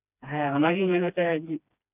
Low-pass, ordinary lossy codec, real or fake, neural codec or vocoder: 3.6 kHz; none; fake; codec, 16 kHz, 2 kbps, FreqCodec, smaller model